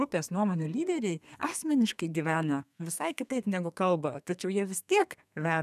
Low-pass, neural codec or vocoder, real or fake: 14.4 kHz; codec, 32 kHz, 1.9 kbps, SNAC; fake